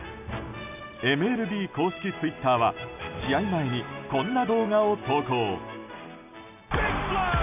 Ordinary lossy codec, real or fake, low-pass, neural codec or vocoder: none; real; 3.6 kHz; none